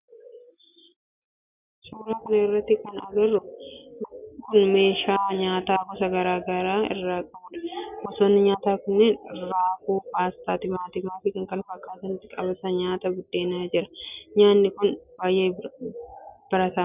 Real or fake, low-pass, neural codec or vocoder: real; 3.6 kHz; none